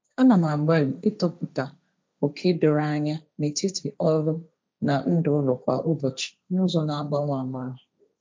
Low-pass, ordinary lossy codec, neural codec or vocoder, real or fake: none; none; codec, 16 kHz, 1.1 kbps, Voila-Tokenizer; fake